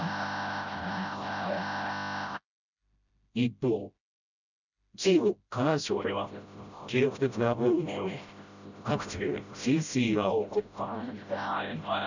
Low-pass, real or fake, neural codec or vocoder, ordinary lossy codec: 7.2 kHz; fake; codec, 16 kHz, 0.5 kbps, FreqCodec, smaller model; none